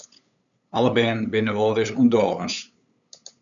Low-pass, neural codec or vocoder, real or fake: 7.2 kHz; codec, 16 kHz, 8 kbps, FunCodec, trained on LibriTTS, 25 frames a second; fake